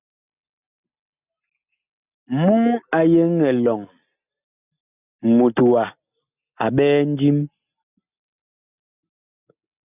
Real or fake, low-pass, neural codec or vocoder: real; 3.6 kHz; none